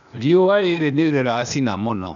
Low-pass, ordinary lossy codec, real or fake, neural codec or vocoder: 7.2 kHz; none; fake; codec, 16 kHz, 0.8 kbps, ZipCodec